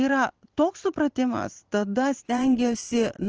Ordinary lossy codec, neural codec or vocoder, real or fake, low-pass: Opus, 16 kbps; vocoder, 44.1 kHz, 128 mel bands every 512 samples, BigVGAN v2; fake; 7.2 kHz